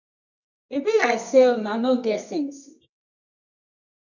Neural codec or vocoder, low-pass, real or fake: codec, 24 kHz, 0.9 kbps, WavTokenizer, medium music audio release; 7.2 kHz; fake